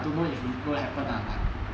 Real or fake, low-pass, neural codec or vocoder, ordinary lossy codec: real; none; none; none